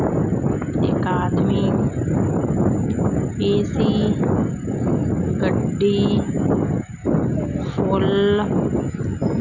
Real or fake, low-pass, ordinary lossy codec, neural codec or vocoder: real; 7.2 kHz; none; none